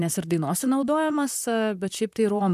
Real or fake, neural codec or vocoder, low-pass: fake; vocoder, 44.1 kHz, 128 mel bands, Pupu-Vocoder; 14.4 kHz